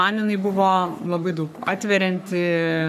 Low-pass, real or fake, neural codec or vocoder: 14.4 kHz; fake; codec, 44.1 kHz, 3.4 kbps, Pupu-Codec